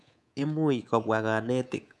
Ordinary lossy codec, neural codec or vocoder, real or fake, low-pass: none; codec, 24 kHz, 3.1 kbps, DualCodec; fake; none